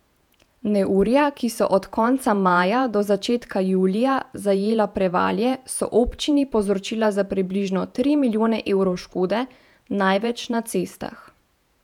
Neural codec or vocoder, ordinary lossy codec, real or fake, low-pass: vocoder, 48 kHz, 128 mel bands, Vocos; none; fake; 19.8 kHz